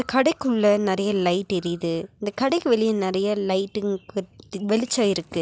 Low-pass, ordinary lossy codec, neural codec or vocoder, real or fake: none; none; none; real